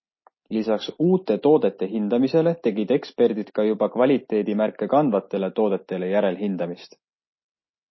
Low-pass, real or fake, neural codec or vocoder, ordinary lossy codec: 7.2 kHz; real; none; MP3, 24 kbps